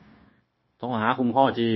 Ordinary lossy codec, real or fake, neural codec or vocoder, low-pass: MP3, 24 kbps; fake; codec, 16 kHz, 0.9 kbps, LongCat-Audio-Codec; 7.2 kHz